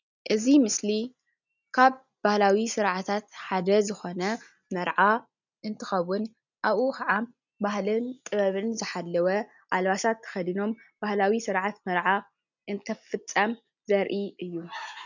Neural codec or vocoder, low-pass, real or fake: none; 7.2 kHz; real